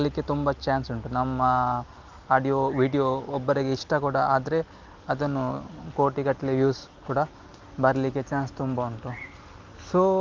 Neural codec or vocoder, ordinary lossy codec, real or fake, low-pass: none; Opus, 24 kbps; real; 7.2 kHz